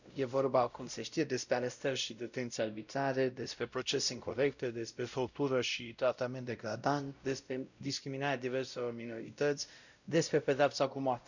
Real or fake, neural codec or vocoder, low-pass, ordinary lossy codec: fake; codec, 16 kHz, 0.5 kbps, X-Codec, WavLM features, trained on Multilingual LibriSpeech; 7.2 kHz; none